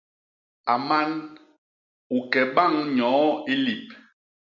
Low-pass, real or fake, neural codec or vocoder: 7.2 kHz; real; none